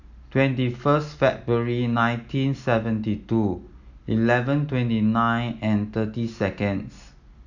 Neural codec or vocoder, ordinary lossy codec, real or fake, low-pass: none; none; real; 7.2 kHz